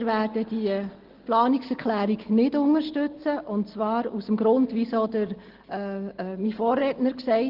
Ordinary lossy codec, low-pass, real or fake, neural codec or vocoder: Opus, 16 kbps; 5.4 kHz; real; none